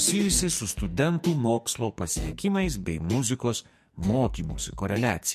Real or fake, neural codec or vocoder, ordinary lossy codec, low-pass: fake; codec, 44.1 kHz, 2.6 kbps, SNAC; MP3, 64 kbps; 14.4 kHz